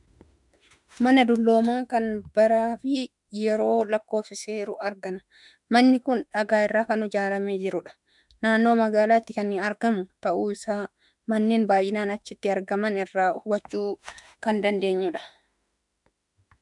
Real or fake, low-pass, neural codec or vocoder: fake; 10.8 kHz; autoencoder, 48 kHz, 32 numbers a frame, DAC-VAE, trained on Japanese speech